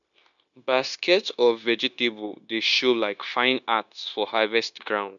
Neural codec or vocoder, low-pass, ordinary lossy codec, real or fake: codec, 16 kHz, 0.9 kbps, LongCat-Audio-Codec; 7.2 kHz; none; fake